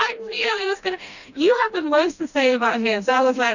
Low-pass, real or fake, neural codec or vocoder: 7.2 kHz; fake; codec, 16 kHz, 1 kbps, FreqCodec, smaller model